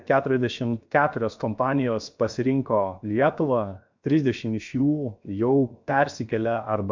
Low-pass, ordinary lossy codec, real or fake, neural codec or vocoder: 7.2 kHz; MP3, 64 kbps; fake; codec, 16 kHz, 0.7 kbps, FocalCodec